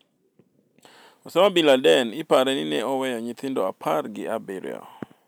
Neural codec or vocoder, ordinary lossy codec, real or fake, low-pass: none; none; real; none